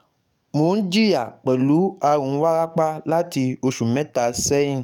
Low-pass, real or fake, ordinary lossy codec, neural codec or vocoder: 19.8 kHz; fake; none; codec, 44.1 kHz, 7.8 kbps, DAC